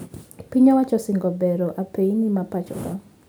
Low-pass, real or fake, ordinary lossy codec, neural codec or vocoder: none; real; none; none